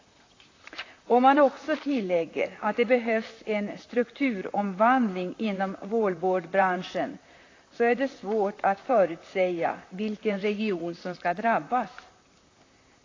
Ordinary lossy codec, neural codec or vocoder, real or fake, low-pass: AAC, 32 kbps; vocoder, 44.1 kHz, 128 mel bands, Pupu-Vocoder; fake; 7.2 kHz